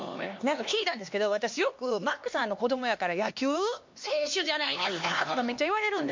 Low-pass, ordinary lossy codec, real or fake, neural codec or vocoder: 7.2 kHz; MP3, 48 kbps; fake; codec, 16 kHz, 2 kbps, X-Codec, HuBERT features, trained on LibriSpeech